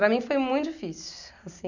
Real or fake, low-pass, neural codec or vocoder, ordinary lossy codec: real; 7.2 kHz; none; none